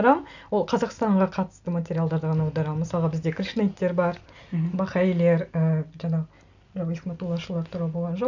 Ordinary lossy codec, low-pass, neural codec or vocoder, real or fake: none; 7.2 kHz; none; real